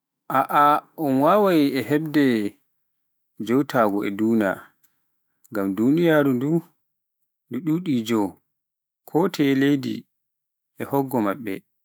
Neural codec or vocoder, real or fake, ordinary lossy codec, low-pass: autoencoder, 48 kHz, 128 numbers a frame, DAC-VAE, trained on Japanese speech; fake; none; none